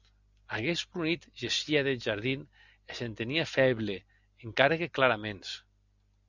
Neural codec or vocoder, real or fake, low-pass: none; real; 7.2 kHz